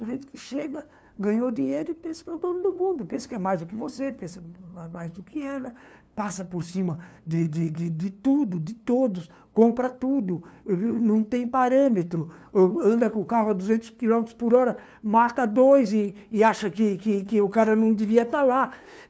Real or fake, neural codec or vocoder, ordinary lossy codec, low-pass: fake; codec, 16 kHz, 2 kbps, FunCodec, trained on LibriTTS, 25 frames a second; none; none